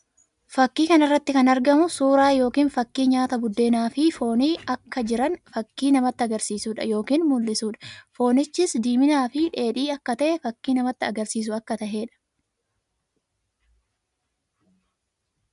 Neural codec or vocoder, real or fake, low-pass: none; real; 10.8 kHz